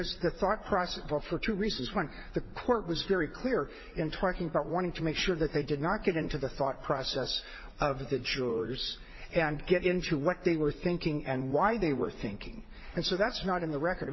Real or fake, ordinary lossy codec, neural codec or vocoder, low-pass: fake; MP3, 24 kbps; vocoder, 44.1 kHz, 80 mel bands, Vocos; 7.2 kHz